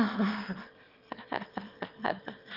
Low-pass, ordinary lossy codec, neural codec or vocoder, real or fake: 5.4 kHz; Opus, 32 kbps; autoencoder, 22.05 kHz, a latent of 192 numbers a frame, VITS, trained on one speaker; fake